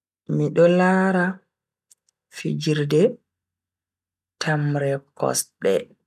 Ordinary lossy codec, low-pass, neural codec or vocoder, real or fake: none; 14.4 kHz; none; real